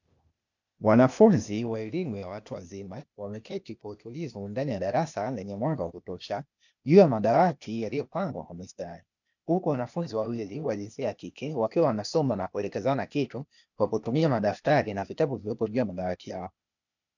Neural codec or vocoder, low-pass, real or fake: codec, 16 kHz, 0.8 kbps, ZipCodec; 7.2 kHz; fake